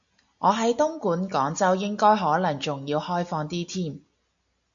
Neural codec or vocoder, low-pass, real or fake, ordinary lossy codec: none; 7.2 kHz; real; AAC, 64 kbps